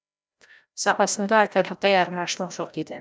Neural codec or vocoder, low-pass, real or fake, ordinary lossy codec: codec, 16 kHz, 0.5 kbps, FreqCodec, larger model; none; fake; none